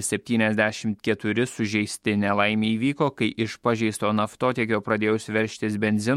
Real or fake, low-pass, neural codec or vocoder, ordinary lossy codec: fake; 19.8 kHz; autoencoder, 48 kHz, 128 numbers a frame, DAC-VAE, trained on Japanese speech; MP3, 64 kbps